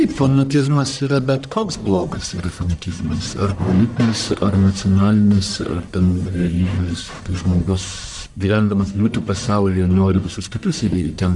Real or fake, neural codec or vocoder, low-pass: fake; codec, 44.1 kHz, 1.7 kbps, Pupu-Codec; 10.8 kHz